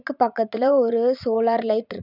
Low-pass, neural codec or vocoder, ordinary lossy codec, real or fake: 5.4 kHz; vocoder, 44.1 kHz, 80 mel bands, Vocos; none; fake